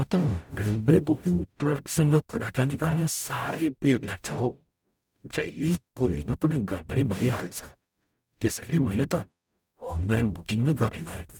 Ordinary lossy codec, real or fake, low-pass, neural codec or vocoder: none; fake; 19.8 kHz; codec, 44.1 kHz, 0.9 kbps, DAC